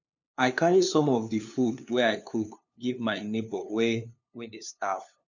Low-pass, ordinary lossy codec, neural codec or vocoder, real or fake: 7.2 kHz; none; codec, 16 kHz, 2 kbps, FunCodec, trained on LibriTTS, 25 frames a second; fake